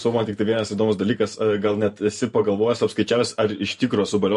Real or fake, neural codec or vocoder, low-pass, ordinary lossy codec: fake; vocoder, 44.1 kHz, 128 mel bands every 512 samples, BigVGAN v2; 14.4 kHz; MP3, 48 kbps